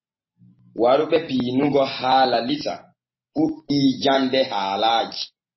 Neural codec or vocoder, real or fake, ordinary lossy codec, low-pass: none; real; MP3, 24 kbps; 7.2 kHz